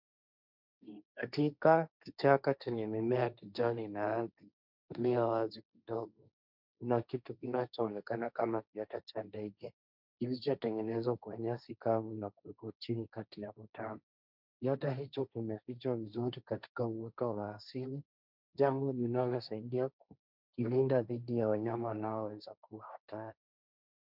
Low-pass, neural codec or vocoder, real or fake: 5.4 kHz; codec, 16 kHz, 1.1 kbps, Voila-Tokenizer; fake